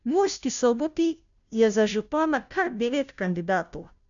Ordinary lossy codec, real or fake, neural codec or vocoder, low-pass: none; fake; codec, 16 kHz, 0.5 kbps, FunCodec, trained on Chinese and English, 25 frames a second; 7.2 kHz